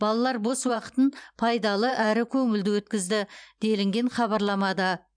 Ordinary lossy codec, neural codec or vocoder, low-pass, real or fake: none; vocoder, 44.1 kHz, 128 mel bands every 512 samples, BigVGAN v2; 9.9 kHz; fake